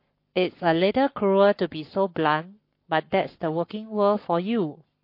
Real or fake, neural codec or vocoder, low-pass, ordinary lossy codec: fake; codec, 44.1 kHz, 7.8 kbps, Pupu-Codec; 5.4 kHz; MP3, 32 kbps